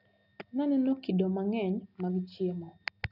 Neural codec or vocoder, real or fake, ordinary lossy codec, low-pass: none; real; none; 5.4 kHz